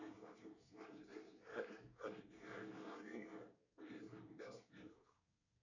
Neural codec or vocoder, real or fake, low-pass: codec, 24 kHz, 1 kbps, SNAC; fake; 7.2 kHz